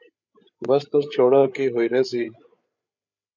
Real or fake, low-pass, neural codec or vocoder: fake; 7.2 kHz; codec, 16 kHz, 8 kbps, FreqCodec, larger model